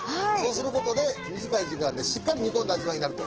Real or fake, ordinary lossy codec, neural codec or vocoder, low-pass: fake; Opus, 16 kbps; autoencoder, 48 kHz, 128 numbers a frame, DAC-VAE, trained on Japanese speech; 7.2 kHz